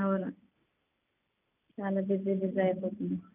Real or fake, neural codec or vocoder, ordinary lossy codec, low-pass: real; none; none; 3.6 kHz